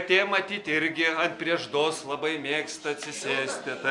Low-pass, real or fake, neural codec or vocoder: 10.8 kHz; real; none